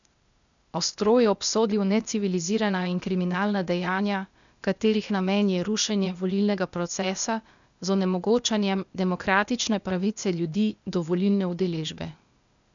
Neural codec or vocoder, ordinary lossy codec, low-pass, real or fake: codec, 16 kHz, 0.8 kbps, ZipCodec; none; 7.2 kHz; fake